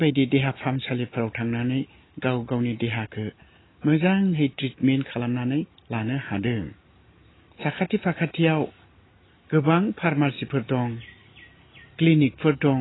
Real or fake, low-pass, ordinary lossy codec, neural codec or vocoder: real; 7.2 kHz; AAC, 16 kbps; none